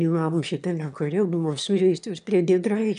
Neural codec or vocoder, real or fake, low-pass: autoencoder, 22.05 kHz, a latent of 192 numbers a frame, VITS, trained on one speaker; fake; 9.9 kHz